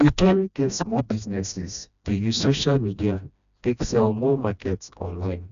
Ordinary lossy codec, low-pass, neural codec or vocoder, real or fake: none; 7.2 kHz; codec, 16 kHz, 1 kbps, FreqCodec, smaller model; fake